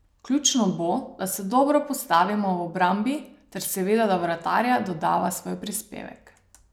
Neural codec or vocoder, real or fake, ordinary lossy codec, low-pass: none; real; none; none